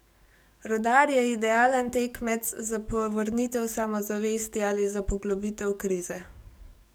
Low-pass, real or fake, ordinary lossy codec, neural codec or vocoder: none; fake; none; codec, 44.1 kHz, 7.8 kbps, DAC